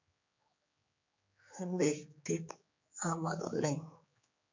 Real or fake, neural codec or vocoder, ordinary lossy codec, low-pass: fake; codec, 16 kHz, 4 kbps, X-Codec, HuBERT features, trained on general audio; MP3, 48 kbps; 7.2 kHz